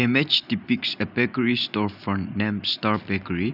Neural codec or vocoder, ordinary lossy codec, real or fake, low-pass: none; none; real; 5.4 kHz